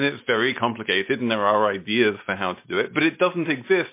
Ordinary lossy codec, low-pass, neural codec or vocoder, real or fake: MP3, 24 kbps; 3.6 kHz; none; real